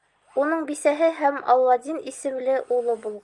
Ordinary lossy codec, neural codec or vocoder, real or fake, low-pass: Opus, 32 kbps; none; real; 10.8 kHz